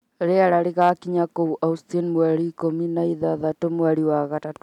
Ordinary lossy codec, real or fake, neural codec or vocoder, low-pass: none; real; none; 19.8 kHz